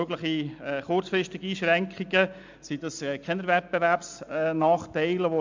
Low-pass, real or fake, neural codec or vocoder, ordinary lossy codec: 7.2 kHz; real; none; MP3, 64 kbps